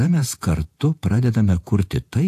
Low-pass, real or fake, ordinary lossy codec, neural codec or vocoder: 14.4 kHz; fake; AAC, 64 kbps; vocoder, 48 kHz, 128 mel bands, Vocos